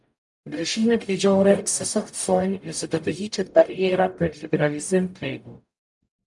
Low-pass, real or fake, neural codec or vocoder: 10.8 kHz; fake; codec, 44.1 kHz, 0.9 kbps, DAC